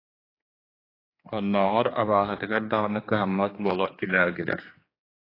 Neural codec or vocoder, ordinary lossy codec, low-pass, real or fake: codec, 16 kHz, 4 kbps, X-Codec, HuBERT features, trained on general audio; AAC, 24 kbps; 5.4 kHz; fake